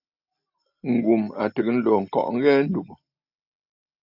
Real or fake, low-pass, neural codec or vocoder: real; 5.4 kHz; none